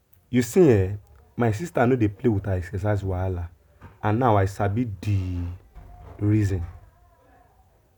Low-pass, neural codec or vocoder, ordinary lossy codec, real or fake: 19.8 kHz; none; none; real